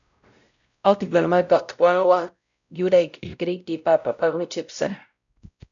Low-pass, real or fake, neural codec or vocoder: 7.2 kHz; fake; codec, 16 kHz, 0.5 kbps, X-Codec, WavLM features, trained on Multilingual LibriSpeech